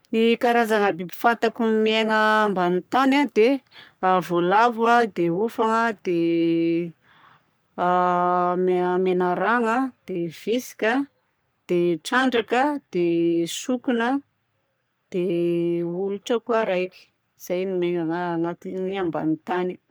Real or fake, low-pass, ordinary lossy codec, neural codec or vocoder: fake; none; none; codec, 44.1 kHz, 3.4 kbps, Pupu-Codec